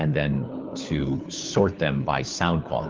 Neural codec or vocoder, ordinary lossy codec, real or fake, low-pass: codec, 16 kHz, 4 kbps, FunCodec, trained on LibriTTS, 50 frames a second; Opus, 32 kbps; fake; 7.2 kHz